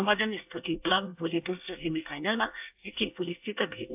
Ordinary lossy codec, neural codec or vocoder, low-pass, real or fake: AAC, 32 kbps; codec, 24 kHz, 1 kbps, SNAC; 3.6 kHz; fake